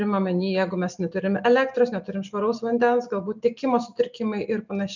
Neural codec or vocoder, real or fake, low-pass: none; real; 7.2 kHz